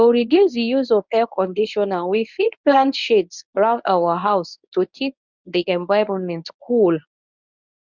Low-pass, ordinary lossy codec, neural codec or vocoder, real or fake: 7.2 kHz; none; codec, 24 kHz, 0.9 kbps, WavTokenizer, medium speech release version 1; fake